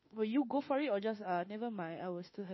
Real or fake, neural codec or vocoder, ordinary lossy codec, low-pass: fake; autoencoder, 48 kHz, 32 numbers a frame, DAC-VAE, trained on Japanese speech; MP3, 24 kbps; 7.2 kHz